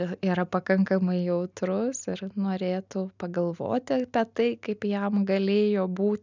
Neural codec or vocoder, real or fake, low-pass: none; real; 7.2 kHz